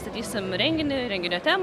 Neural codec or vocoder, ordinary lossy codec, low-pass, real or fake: none; Opus, 64 kbps; 14.4 kHz; real